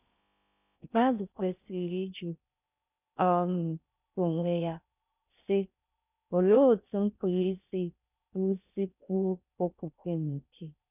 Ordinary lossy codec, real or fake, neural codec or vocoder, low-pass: none; fake; codec, 16 kHz in and 24 kHz out, 0.6 kbps, FocalCodec, streaming, 4096 codes; 3.6 kHz